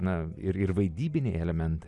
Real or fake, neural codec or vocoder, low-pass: real; none; 10.8 kHz